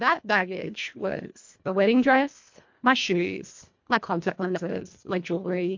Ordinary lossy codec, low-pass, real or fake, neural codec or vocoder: MP3, 48 kbps; 7.2 kHz; fake; codec, 24 kHz, 1.5 kbps, HILCodec